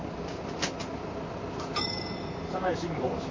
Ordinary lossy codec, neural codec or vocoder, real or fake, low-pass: MP3, 32 kbps; vocoder, 44.1 kHz, 128 mel bands, Pupu-Vocoder; fake; 7.2 kHz